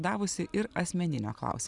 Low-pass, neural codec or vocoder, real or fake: 10.8 kHz; none; real